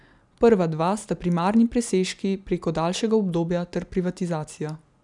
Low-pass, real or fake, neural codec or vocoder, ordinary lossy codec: 10.8 kHz; real; none; none